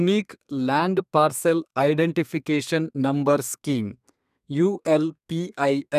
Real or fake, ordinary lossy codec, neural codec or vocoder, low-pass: fake; none; codec, 32 kHz, 1.9 kbps, SNAC; 14.4 kHz